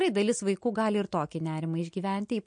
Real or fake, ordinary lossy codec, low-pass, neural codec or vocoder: real; MP3, 48 kbps; 9.9 kHz; none